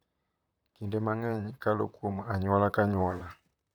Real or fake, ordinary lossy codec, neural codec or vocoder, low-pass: fake; none; vocoder, 44.1 kHz, 128 mel bands, Pupu-Vocoder; none